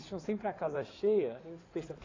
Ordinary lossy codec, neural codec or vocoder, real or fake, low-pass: AAC, 32 kbps; codec, 16 kHz in and 24 kHz out, 2.2 kbps, FireRedTTS-2 codec; fake; 7.2 kHz